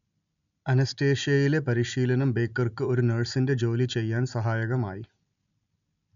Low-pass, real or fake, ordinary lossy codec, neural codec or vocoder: 7.2 kHz; real; none; none